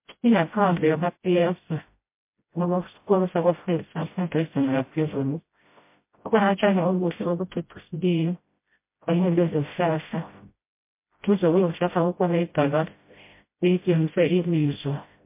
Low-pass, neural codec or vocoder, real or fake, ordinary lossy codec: 3.6 kHz; codec, 16 kHz, 0.5 kbps, FreqCodec, smaller model; fake; MP3, 24 kbps